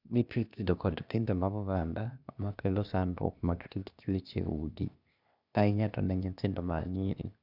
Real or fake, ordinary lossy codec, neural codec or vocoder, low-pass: fake; none; codec, 16 kHz, 0.8 kbps, ZipCodec; 5.4 kHz